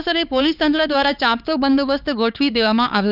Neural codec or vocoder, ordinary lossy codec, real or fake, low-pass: codec, 16 kHz, 4 kbps, X-Codec, HuBERT features, trained on LibriSpeech; none; fake; 5.4 kHz